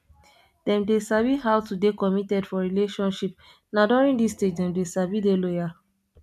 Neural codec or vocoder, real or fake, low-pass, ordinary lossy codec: none; real; 14.4 kHz; none